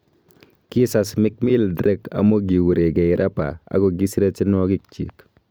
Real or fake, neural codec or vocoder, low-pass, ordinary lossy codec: fake; vocoder, 44.1 kHz, 128 mel bands every 256 samples, BigVGAN v2; none; none